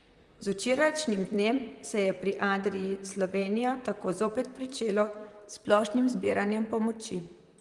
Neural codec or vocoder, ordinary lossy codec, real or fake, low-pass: vocoder, 44.1 kHz, 128 mel bands, Pupu-Vocoder; Opus, 32 kbps; fake; 10.8 kHz